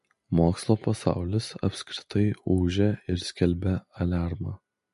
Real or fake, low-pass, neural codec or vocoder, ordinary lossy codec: real; 14.4 kHz; none; MP3, 48 kbps